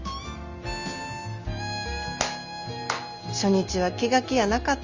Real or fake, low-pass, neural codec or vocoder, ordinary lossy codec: real; 7.2 kHz; none; Opus, 32 kbps